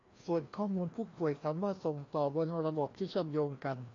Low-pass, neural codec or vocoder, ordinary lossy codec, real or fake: 7.2 kHz; codec, 16 kHz, 1 kbps, FreqCodec, larger model; AAC, 32 kbps; fake